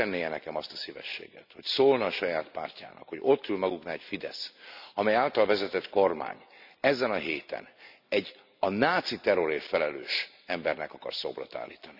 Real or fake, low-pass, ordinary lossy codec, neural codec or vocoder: real; 5.4 kHz; none; none